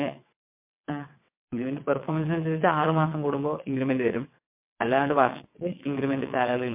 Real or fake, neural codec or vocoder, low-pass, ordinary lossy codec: fake; vocoder, 22.05 kHz, 80 mel bands, WaveNeXt; 3.6 kHz; MP3, 32 kbps